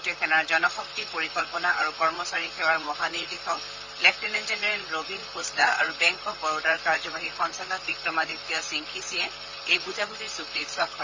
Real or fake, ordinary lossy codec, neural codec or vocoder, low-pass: fake; Opus, 32 kbps; vocoder, 44.1 kHz, 128 mel bands, Pupu-Vocoder; 7.2 kHz